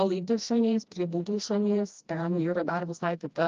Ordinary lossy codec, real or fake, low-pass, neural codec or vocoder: Opus, 24 kbps; fake; 7.2 kHz; codec, 16 kHz, 1 kbps, FreqCodec, smaller model